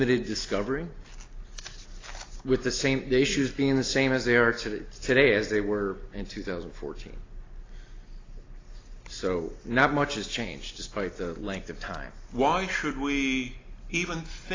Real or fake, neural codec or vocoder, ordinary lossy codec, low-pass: real; none; AAC, 32 kbps; 7.2 kHz